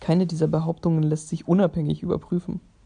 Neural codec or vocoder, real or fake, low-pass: none; real; 9.9 kHz